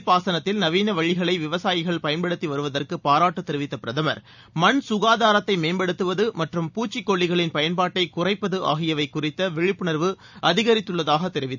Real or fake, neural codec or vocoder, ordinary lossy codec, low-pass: real; none; none; 7.2 kHz